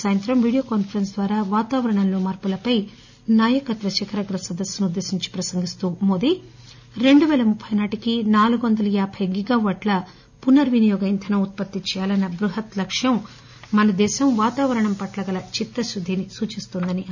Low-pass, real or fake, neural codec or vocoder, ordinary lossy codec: 7.2 kHz; real; none; none